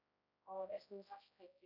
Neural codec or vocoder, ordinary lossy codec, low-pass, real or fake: codec, 16 kHz, 0.5 kbps, X-Codec, HuBERT features, trained on general audio; none; 5.4 kHz; fake